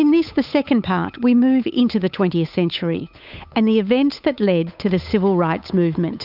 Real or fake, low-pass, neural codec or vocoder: fake; 5.4 kHz; codec, 16 kHz, 8 kbps, FunCodec, trained on Chinese and English, 25 frames a second